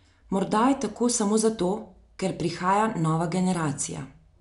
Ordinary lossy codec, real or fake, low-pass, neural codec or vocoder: none; real; 10.8 kHz; none